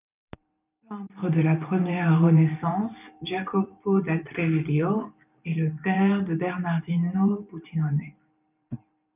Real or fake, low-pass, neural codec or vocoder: fake; 3.6 kHz; vocoder, 44.1 kHz, 128 mel bands every 512 samples, BigVGAN v2